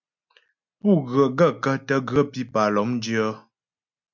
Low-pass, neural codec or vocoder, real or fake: 7.2 kHz; none; real